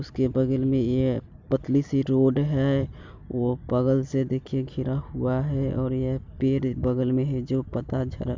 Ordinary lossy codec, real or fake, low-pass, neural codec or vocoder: MP3, 64 kbps; real; 7.2 kHz; none